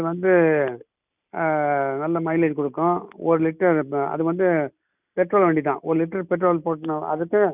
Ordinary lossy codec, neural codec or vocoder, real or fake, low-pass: none; none; real; 3.6 kHz